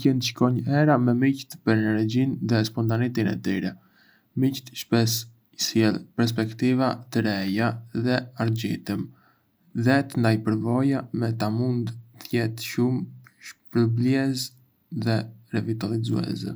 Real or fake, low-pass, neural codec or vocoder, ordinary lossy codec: real; none; none; none